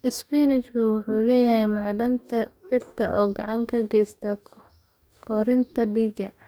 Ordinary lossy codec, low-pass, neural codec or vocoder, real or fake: none; none; codec, 44.1 kHz, 2.6 kbps, DAC; fake